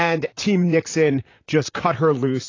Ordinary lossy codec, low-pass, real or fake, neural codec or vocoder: AAC, 32 kbps; 7.2 kHz; fake; vocoder, 44.1 kHz, 128 mel bands, Pupu-Vocoder